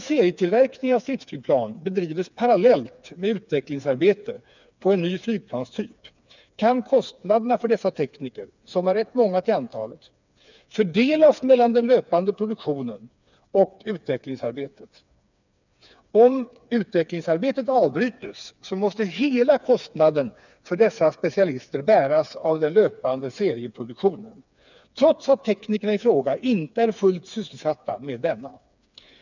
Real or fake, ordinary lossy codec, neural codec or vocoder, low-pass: fake; none; codec, 16 kHz, 4 kbps, FreqCodec, smaller model; 7.2 kHz